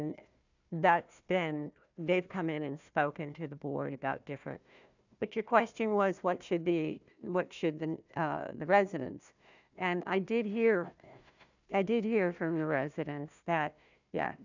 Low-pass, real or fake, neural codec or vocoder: 7.2 kHz; fake; codec, 16 kHz, 1 kbps, FunCodec, trained on Chinese and English, 50 frames a second